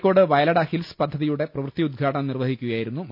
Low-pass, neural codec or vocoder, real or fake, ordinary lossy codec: 5.4 kHz; none; real; none